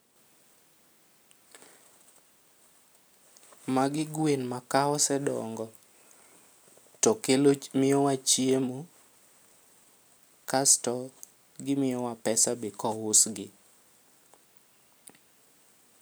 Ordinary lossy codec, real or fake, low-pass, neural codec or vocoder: none; real; none; none